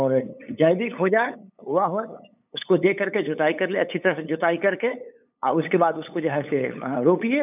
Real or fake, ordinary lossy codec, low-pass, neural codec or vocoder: fake; none; 3.6 kHz; codec, 16 kHz, 8 kbps, FunCodec, trained on LibriTTS, 25 frames a second